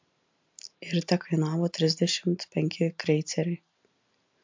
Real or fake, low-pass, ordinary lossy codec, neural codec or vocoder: real; 7.2 kHz; MP3, 64 kbps; none